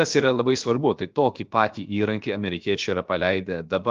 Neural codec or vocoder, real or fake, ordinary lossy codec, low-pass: codec, 16 kHz, about 1 kbps, DyCAST, with the encoder's durations; fake; Opus, 32 kbps; 7.2 kHz